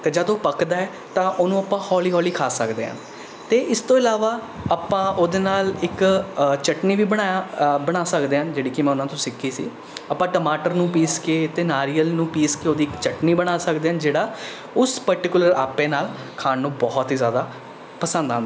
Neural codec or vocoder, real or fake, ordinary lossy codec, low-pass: none; real; none; none